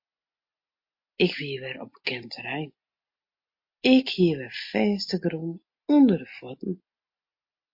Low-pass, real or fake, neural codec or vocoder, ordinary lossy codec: 5.4 kHz; real; none; MP3, 32 kbps